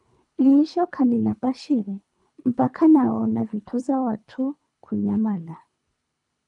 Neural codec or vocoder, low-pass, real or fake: codec, 24 kHz, 3 kbps, HILCodec; 10.8 kHz; fake